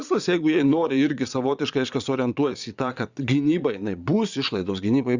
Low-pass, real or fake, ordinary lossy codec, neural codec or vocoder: 7.2 kHz; fake; Opus, 64 kbps; vocoder, 22.05 kHz, 80 mel bands, Vocos